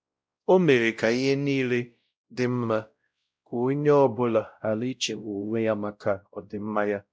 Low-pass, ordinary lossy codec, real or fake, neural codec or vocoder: none; none; fake; codec, 16 kHz, 0.5 kbps, X-Codec, WavLM features, trained on Multilingual LibriSpeech